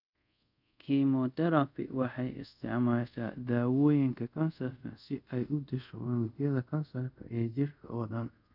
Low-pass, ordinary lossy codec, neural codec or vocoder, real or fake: 5.4 kHz; none; codec, 24 kHz, 0.5 kbps, DualCodec; fake